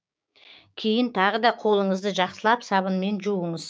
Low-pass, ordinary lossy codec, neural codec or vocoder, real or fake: none; none; codec, 16 kHz, 6 kbps, DAC; fake